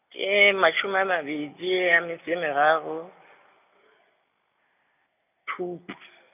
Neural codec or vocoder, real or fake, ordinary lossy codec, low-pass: none; real; none; 3.6 kHz